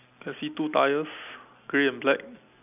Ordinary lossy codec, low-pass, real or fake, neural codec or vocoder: none; 3.6 kHz; real; none